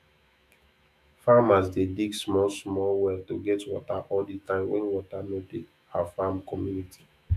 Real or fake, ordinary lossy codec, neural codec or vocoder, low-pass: fake; none; autoencoder, 48 kHz, 128 numbers a frame, DAC-VAE, trained on Japanese speech; 14.4 kHz